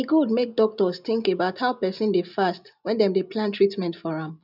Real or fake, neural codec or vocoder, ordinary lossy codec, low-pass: real; none; none; 5.4 kHz